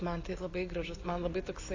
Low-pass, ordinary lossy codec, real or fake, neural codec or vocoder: 7.2 kHz; AAC, 32 kbps; real; none